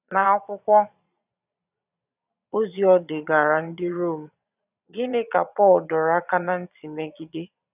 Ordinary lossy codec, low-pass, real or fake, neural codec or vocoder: none; 3.6 kHz; fake; vocoder, 22.05 kHz, 80 mel bands, Vocos